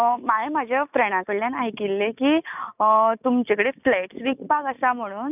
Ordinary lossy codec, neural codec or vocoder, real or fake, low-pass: none; none; real; 3.6 kHz